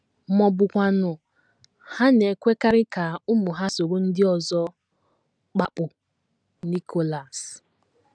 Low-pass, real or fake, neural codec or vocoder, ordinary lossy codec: 9.9 kHz; real; none; none